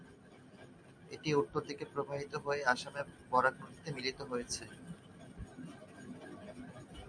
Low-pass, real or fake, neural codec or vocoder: 9.9 kHz; real; none